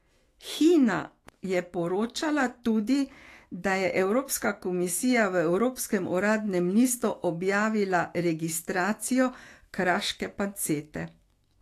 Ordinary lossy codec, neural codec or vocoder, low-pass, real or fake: AAC, 48 kbps; autoencoder, 48 kHz, 128 numbers a frame, DAC-VAE, trained on Japanese speech; 14.4 kHz; fake